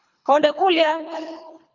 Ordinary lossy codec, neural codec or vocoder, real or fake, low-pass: MP3, 64 kbps; codec, 24 kHz, 3 kbps, HILCodec; fake; 7.2 kHz